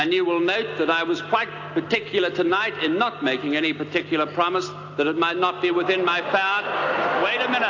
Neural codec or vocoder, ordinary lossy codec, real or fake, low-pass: none; AAC, 48 kbps; real; 7.2 kHz